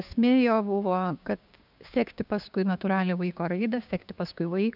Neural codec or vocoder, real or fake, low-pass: codec, 16 kHz, 6 kbps, DAC; fake; 5.4 kHz